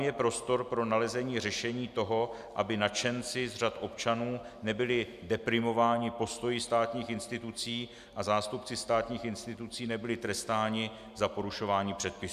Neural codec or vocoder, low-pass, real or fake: none; 14.4 kHz; real